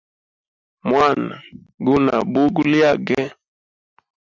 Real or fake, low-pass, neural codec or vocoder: real; 7.2 kHz; none